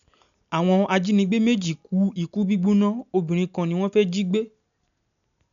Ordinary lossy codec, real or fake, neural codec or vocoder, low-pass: none; real; none; 7.2 kHz